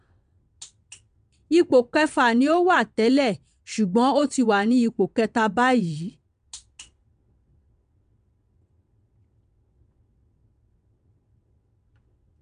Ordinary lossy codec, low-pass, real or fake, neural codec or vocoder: none; 9.9 kHz; fake; vocoder, 22.05 kHz, 80 mel bands, WaveNeXt